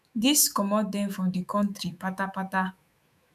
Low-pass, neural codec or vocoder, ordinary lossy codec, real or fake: 14.4 kHz; autoencoder, 48 kHz, 128 numbers a frame, DAC-VAE, trained on Japanese speech; none; fake